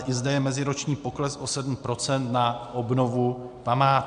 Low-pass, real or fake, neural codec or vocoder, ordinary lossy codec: 9.9 kHz; real; none; MP3, 64 kbps